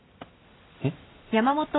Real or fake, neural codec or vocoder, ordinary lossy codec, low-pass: real; none; AAC, 16 kbps; 7.2 kHz